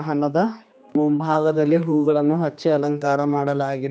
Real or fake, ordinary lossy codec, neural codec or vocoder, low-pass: fake; none; codec, 16 kHz, 2 kbps, X-Codec, HuBERT features, trained on general audio; none